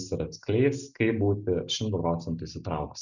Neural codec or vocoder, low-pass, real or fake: none; 7.2 kHz; real